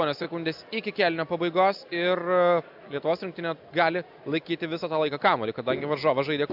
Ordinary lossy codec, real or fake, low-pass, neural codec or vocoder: AAC, 48 kbps; real; 5.4 kHz; none